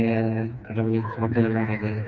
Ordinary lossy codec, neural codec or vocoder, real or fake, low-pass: none; codec, 16 kHz, 2 kbps, FreqCodec, smaller model; fake; 7.2 kHz